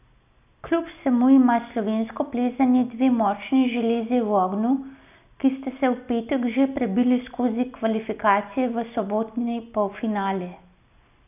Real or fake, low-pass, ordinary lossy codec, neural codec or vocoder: real; 3.6 kHz; none; none